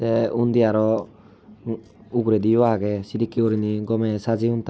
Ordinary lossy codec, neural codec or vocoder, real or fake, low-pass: none; none; real; none